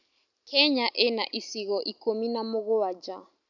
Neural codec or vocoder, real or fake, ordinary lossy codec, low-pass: none; real; none; 7.2 kHz